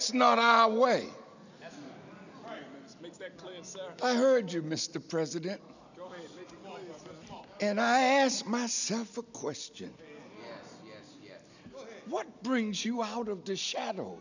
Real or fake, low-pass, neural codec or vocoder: real; 7.2 kHz; none